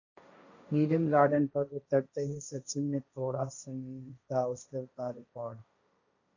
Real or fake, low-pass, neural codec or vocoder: fake; 7.2 kHz; codec, 16 kHz, 1.1 kbps, Voila-Tokenizer